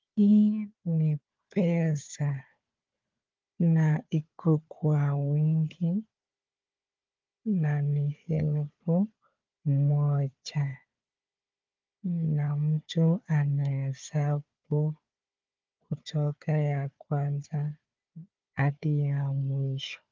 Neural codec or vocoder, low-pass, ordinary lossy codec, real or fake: codec, 16 kHz, 4 kbps, FunCodec, trained on Chinese and English, 50 frames a second; 7.2 kHz; Opus, 24 kbps; fake